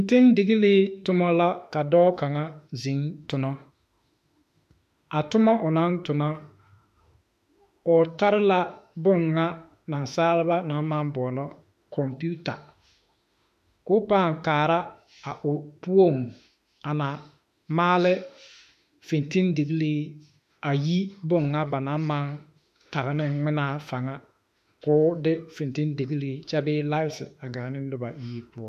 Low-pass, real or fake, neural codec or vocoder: 14.4 kHz; fake; autoencoder, 48 kHz, 32 numbers a frame, DAC-VAE, trained on Japanese speech